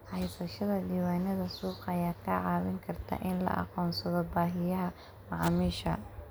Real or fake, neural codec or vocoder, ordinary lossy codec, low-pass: real; none; none; none